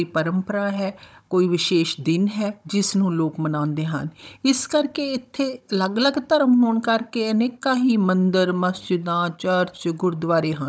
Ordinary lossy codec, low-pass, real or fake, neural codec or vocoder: none; none; fake; codec, 16 kHz, 16 kbps, FunCodec, trained on Chinese and English, 50 frames a second